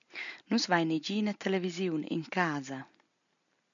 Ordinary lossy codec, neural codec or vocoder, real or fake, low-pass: MP3, 96 kbps; none; real; 7.2 kHz